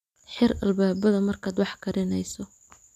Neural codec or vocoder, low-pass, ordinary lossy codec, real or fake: none; 14.4 kHz; none; real